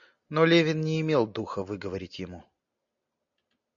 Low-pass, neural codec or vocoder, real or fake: 7.2 kHz; none; real